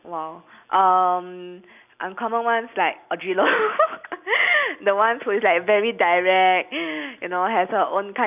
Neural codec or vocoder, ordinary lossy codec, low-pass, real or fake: none; none; 3.6 kHz; real